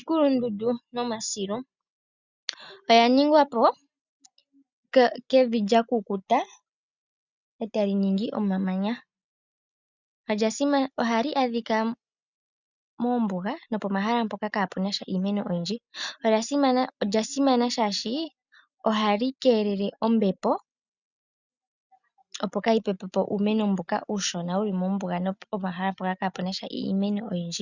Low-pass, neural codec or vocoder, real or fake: 7.2 kHz; none; real